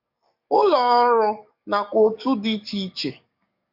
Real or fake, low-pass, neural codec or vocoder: fake; 5.4 kHz; codec, 44.1 kHz, 7.8 kbps, DAC